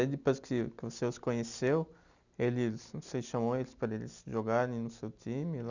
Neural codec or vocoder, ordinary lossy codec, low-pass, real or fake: none; none; 7.2 kHz; real